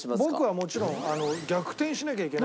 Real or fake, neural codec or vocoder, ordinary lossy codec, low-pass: real; none; none; none